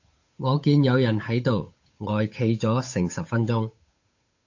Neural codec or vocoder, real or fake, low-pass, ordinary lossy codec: codec, 16 kHz, 8 kbps, FunCodec, trained on Chinese and English, 25 frames a second; fake; 7.2 kHz; AAC, 48 kbps